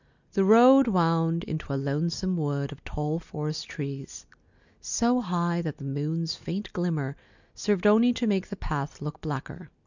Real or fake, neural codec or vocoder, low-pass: real; none; 7.2 kHz